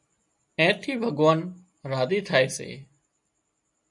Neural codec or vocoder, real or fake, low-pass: none; real; 10.8 kHz